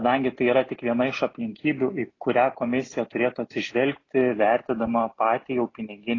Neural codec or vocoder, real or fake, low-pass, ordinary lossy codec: none; real; 7.2 kHz; AAC, 32 kbps